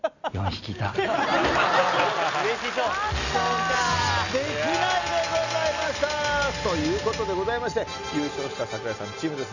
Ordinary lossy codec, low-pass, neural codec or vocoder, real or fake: none; 7.2 kHz; none; real